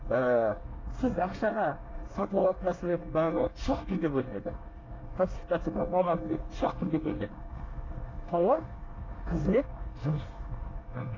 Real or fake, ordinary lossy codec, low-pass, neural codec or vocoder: fake; AAC, 32 kbps; 7.2 kHz; codec, 24 kHz, 1 kbps, SNAC